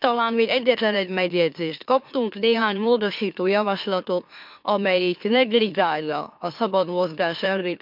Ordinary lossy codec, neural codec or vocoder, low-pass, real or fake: MP3, 48 kbps; autoencoder, 44.1 kHz, a latent of 192 numbers a frame, MeloTTS; 5.4 kHz; fake